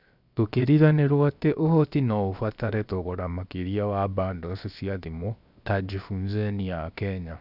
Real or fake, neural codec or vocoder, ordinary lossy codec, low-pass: fake; codec, 16 kHz, 0.7 kbps, FocalCodec; none; 5.4 kHz